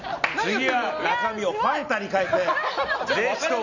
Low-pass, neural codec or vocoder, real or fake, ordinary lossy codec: 7.2 kHz; none; real; none